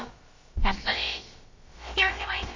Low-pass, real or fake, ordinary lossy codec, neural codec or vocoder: 7.2 kHz; fake; MP3, 32 kbps; codec, 16 kHz, about 1 kbps, DyCAST, with the encoder's durations